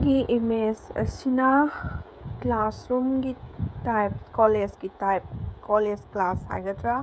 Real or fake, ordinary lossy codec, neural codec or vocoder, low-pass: fake; none; codec, 16 kHz, 16 kbps, FreqCodec, smaller model; none